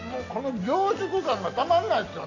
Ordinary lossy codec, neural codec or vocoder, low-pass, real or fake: none; none; 7.2 kHz; real